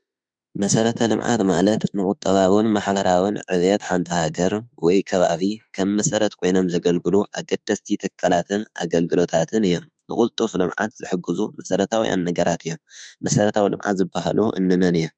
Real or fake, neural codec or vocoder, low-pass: fake; autoencoder, 48 kHz, 32 numbers a frame, DAC-VAE, trained on Japanese speech; 9.9 kHz